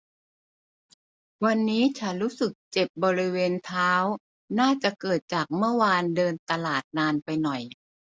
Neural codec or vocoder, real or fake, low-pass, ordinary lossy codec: none; real; none; none